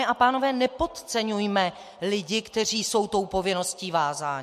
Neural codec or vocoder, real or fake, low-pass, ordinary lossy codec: none; real; 14.4 kHz; MP3, 64 kbps